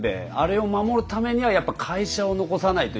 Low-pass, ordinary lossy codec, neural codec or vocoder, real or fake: none; none; none; real